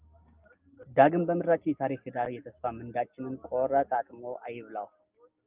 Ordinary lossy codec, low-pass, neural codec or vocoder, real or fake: Opus, 24 kbps; 3.6 kHz; none; real